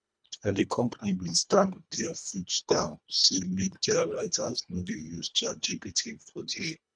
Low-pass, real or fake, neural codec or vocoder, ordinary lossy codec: 9.9 kHz; fake; codec, 24 kHz, 1.5 kbps, HILCodec; none